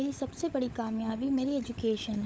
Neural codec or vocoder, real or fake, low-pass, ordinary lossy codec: codec, 16 kHz, 16 kbps, FunCodec, trained on LibriTTS, 50 frames a second; fake; none; none